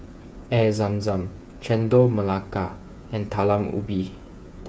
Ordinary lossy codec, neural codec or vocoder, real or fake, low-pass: none; codec, 16 kHz, 8 kbps, FreqCodec, smaller model; fake; none